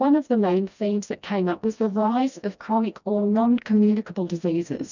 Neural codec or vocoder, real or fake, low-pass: codec, 16 kHz, 1 kbps, FreqCodec, smaller model; fake; 7.2 kHz